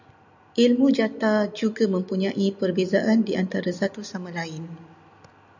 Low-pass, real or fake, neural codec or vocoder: 7.2 kHz; real; none